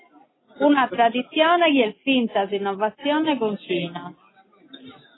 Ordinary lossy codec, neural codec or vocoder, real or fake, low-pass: AAC, 16 kbps; none; real; 7.2 kHz